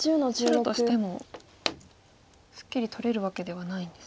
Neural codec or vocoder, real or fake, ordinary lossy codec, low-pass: none; real; none; none